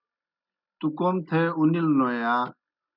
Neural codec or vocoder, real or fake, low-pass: none; real; 5.4 kHz